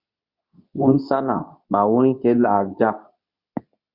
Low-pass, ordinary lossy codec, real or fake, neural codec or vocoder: 5.4 kHz; Opus, 64 kbps; fake; codec, 24 kHz, 0.9 kbps, WavTokenizer, medium speech release version 2